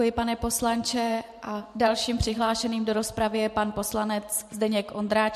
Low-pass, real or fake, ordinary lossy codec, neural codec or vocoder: 14.4 kHz; fake; MP3, 64 kbps; vocoder, 44.1 kHz, 128 mel bands every 512 samples, BigVGAN v2